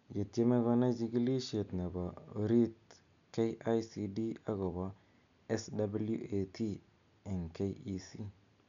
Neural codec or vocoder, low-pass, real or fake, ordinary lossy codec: none; 7.2 kHz; real; none